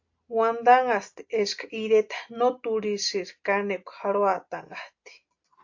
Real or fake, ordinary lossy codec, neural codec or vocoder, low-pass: real; AAC, 48 kbps; none; 7.2 kHz